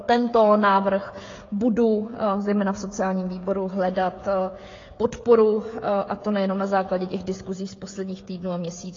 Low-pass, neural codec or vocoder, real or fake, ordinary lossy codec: 7.2 kHz; codec, 16 kHz, 16 kbps, FreqCodec, smaller model; fake; AAC, 32 kbps